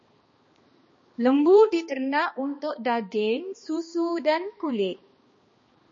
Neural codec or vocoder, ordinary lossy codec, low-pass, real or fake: codec, 16 kHz, 2 kbps, X-Codec, HuBERT features, trained on balanced general audio; MP3, 32 kbps; 7.2 kHz; fake